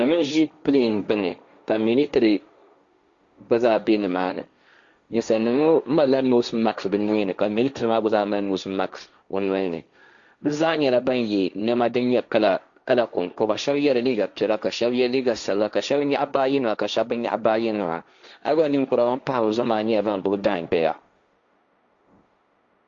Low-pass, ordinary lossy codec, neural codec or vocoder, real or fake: 7.2 kHz; Opus, 64 kbps; codec, 16 kHz, 1.1 kbps, Voila-Tokenizer; fake